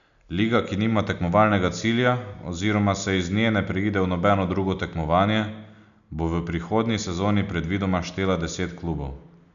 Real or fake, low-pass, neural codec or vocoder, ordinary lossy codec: real; 7.2 kHz; none; none